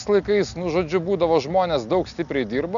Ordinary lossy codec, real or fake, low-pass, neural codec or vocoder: MP3, 96 kbps; real; 7.2 kHz; none